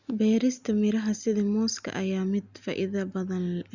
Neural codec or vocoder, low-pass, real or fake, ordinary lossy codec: none; 7.2 kHz; real; Opus, 64 kbps